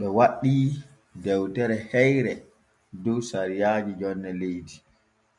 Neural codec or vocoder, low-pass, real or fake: none; 10.8 kHz; real